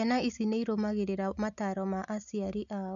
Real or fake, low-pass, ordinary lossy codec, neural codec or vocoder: real; 7.2 kHz; none; none